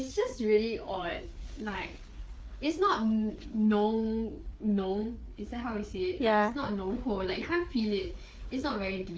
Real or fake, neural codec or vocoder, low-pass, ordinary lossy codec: fake; codec, 16 kHz, 4 kbps, FreqCodec, larger model; none; none